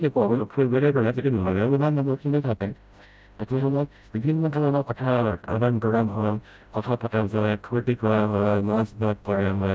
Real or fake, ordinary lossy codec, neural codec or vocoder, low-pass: fake; none; codec, 16 kHz, 0.5 kbps, FreqCodec, smaller model; none